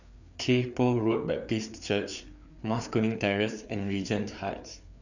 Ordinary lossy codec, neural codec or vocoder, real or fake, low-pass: none; codec, 16 kHz, 4 kbps, FreqCodec, larger model; fake; 7.2 kHz